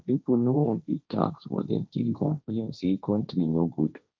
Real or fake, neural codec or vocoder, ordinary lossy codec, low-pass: fake; codec, 16 kHz, 1.1 kbps, Voila-Tokenizer; none; none